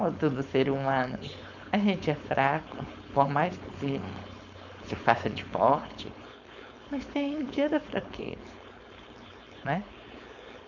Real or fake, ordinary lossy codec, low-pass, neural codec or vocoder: fake; none; 7.2 kHz; codec, 16 kHz, 4.8 kbps, FACodec